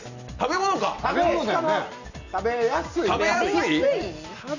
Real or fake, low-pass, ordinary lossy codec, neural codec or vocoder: real; 7.2 kHz; none; none